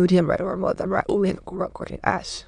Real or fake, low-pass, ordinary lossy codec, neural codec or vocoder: fake; 9.9 kHz; none; autoencoder, 22.05 kHz, a latent of 192 numbers a frame, VITS, trained on many speakers